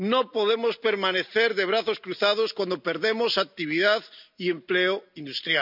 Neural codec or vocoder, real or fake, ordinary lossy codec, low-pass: none; real; none; 5.4 kHz